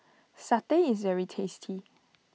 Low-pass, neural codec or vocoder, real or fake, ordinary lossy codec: none; none; real; none